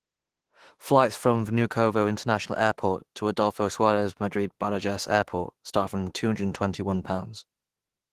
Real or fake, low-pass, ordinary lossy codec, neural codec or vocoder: fake; 14.4 kHz; Opus, 16 kbps; autoencoder, 48 kHz, 32 numbers a frame, DAC-VAE, trained on Japanese speech